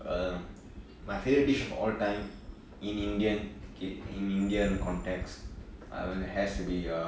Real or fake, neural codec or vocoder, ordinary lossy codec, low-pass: real; none; none; none